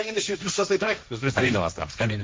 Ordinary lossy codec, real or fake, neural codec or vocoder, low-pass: none; fake; codec, 16 kHz, 1.1 kbps, Voila-Tokenizer; none